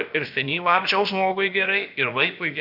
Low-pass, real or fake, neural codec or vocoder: 5.4 kHz; fake; codec, 16 kHz, about 1 kbps, DyCAST, with the encoder's durations